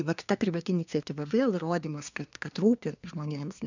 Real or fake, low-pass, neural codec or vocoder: fake; 7.2 kHz; codec, 24 kHz, 1 kbps, SNAC